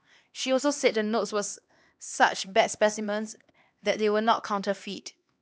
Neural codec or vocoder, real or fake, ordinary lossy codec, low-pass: codec, 16 kHz, 2 kbps, X-Codec, HuBERT features, trained on LibriSpeech; fake; none; none